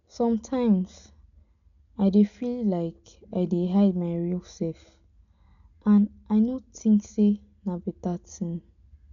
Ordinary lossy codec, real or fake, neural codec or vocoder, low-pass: none; real; none; 7.2 kHz